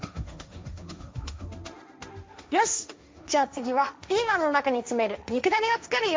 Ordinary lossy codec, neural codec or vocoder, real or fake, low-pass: none; codec, 16 kHz, 1.1 kbps, Voila-Tokenizer; fake; none